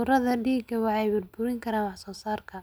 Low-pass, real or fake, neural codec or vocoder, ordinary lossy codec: none; real; none; none